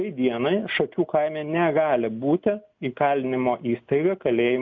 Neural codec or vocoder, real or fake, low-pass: none; real; 7.2 kHz